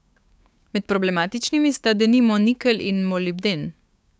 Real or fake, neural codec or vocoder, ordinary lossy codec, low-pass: fake; codec, 16 kHz, 6 kbps, DAC; none; none